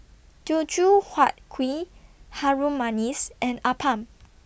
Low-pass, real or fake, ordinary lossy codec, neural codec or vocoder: none; real; none; none